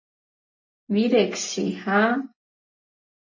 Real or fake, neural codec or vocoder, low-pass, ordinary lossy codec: real; none; 7.2 kHz; MP3, 32 kbps